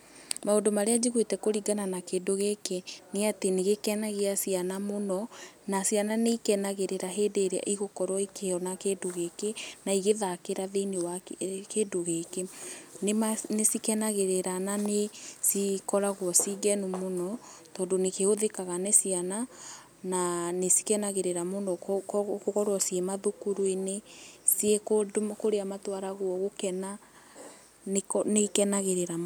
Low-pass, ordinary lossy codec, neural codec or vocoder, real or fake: none; none; none; real